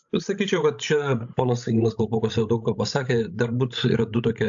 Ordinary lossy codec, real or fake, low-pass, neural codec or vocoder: AAC, 64 kbps; fake; 7.2 kHz; codec, 16 kHz, 16 kbps, FreqCodec, larger model